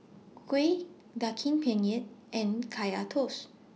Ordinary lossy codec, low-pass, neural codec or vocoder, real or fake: none; none; none; real